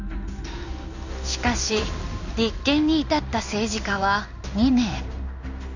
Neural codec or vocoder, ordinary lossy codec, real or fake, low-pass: codec, 16 kHz in and 24 kHz out, 1 kbps, XY-Tokenizer; none; fake; 7.2 kHz